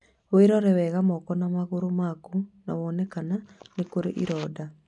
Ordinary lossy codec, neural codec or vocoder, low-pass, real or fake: none; none; 10.8 kHz; real